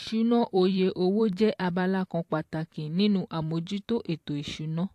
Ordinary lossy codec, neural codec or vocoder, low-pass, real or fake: AAC, 64 kbps; vocoder, 44.1 kHz, 128 mel bands every 512 samples, BigVGAN v2; 14.4 kHz; fake